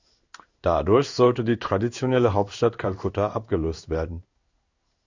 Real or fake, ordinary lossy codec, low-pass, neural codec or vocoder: fake; Opus, 64 kbps; 7.2 kHz; codec, 16 kHz in and 24 kHz out, 1 kbps, XY-Tokenizer